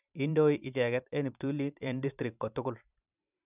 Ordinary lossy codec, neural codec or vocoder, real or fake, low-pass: none; vocoder, 44.1 kHz, 128 mel bands every 512 samples, BigVGAN v2; fake; 3.6 kHz